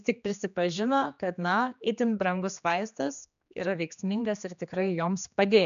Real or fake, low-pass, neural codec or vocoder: fake; 7.2 kHz; codec, 16 kHz, 2 kbps, X-Codec, HuBERT features, trained on general audio